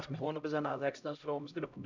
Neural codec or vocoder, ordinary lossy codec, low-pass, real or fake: codec, 16 kHz, 0.5 kbps, X-Codec, HuBERT features, trained on LibriSpeech; none; 7.2 kHz; fake